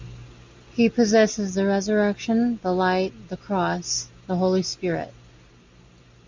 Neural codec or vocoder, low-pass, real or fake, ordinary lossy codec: none; 7.2 kHz; real; MP3, 64 kbps